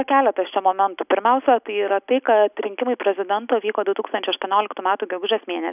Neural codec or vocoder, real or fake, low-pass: none; real; 3.6 kHz